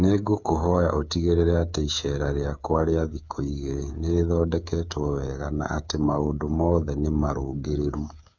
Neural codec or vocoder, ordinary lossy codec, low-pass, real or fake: codec, 16 kHz, 8 kbps, FreqCodec, smaller model; none; 7.2 kHz; fake